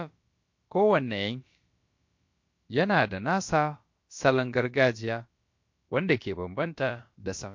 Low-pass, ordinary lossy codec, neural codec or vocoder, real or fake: 7.2 kHz; MP3, 48 kbps; codec, 16 kHz, about 1 kbps, DyCAST, with the encoder's durations; fake